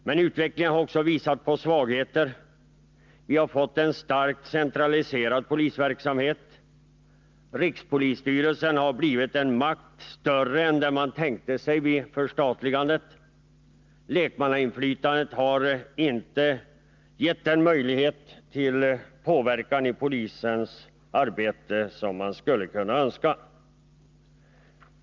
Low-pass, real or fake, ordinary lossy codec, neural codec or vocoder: 7.2 kHz; real; Opus, 24 kbps; none